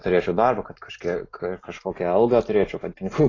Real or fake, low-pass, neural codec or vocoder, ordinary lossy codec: real; 7.2 kHz; none; AAC, 32 kbps